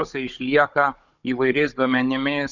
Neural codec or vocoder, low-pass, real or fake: codec, 16 kHz, 16 kbps, FunCodec, trained on Chinese and English, 50 frames a second; 7.2 kHz; fake